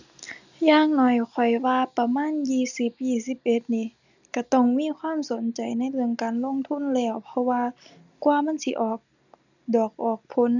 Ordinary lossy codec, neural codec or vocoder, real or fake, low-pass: none; none; real; 7.2 kHz